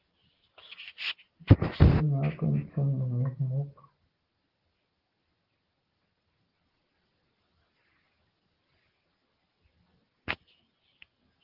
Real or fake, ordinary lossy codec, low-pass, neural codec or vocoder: real; Opus, 16 kbps; 5.4 kHz; none